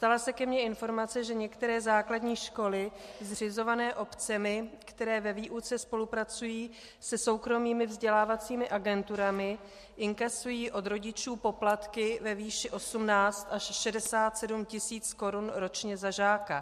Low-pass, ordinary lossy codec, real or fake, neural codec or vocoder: 14.4 kHz; MP3, 64 kbps; real; none